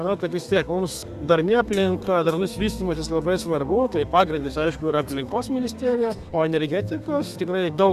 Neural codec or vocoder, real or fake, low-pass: codec, 32 kHz, 1.9 kbps, SNAC; fake; 14.4 kHz